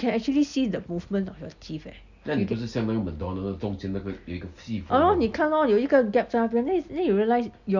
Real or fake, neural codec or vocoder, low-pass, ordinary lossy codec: real; none; 7.2 kHz; none